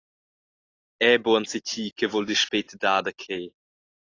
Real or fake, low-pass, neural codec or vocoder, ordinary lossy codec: real; 7.2 kHz; none; AAC, 32 kbps